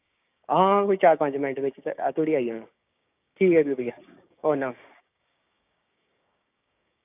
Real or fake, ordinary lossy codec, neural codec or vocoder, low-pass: fake; none; codec, 16 kHz, 6 kbps, DAC; 3.6 kHz